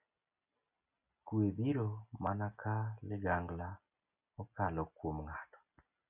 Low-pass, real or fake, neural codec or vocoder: 3.6 kHz; real; none